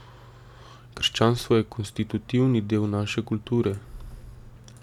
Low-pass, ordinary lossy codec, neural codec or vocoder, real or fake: 19.8 kHz; none; none; real